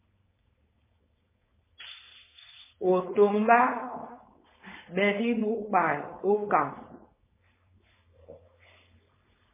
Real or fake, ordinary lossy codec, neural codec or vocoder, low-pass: fake; MP3, 16 kbps; codec, 16 kHz, 4.8 kbps, FACodec; 3.6 kHz